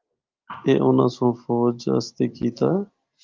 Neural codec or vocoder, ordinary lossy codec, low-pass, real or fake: none; Opus, 32 kbps; 7.2 kHz; real